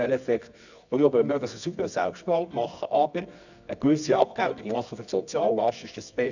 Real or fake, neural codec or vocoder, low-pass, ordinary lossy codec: fake; codec, 24 kHz, 0.9 kbps, WavTokenizer, medium music audio release; 7.2 kHz; none